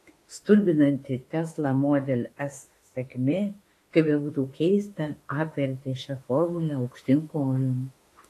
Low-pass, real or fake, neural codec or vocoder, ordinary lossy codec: 14.4 kHz; fake; autoencoder, 48 kHz, 32 numbers a frame, DAC-VAE, trained on Japanese speech; AAC, 48 kbps